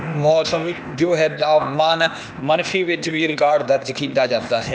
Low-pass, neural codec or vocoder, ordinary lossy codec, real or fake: none; codec, 16 kHz, 0.8 kbps, ZipCodec; none; fake